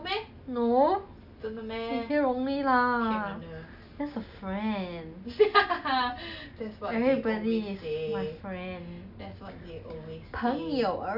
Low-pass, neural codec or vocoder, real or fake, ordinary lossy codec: 5.4 kHz; none; real; none